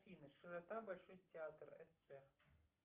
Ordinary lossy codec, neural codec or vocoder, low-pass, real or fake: Opus, 24 kbps; none; 3.6 kHz; real